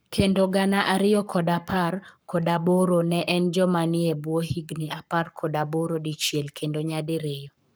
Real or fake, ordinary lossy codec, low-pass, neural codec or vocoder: fake; none; none; codec, 44.1 kHz, 7.8 kbps, Pupu-Codec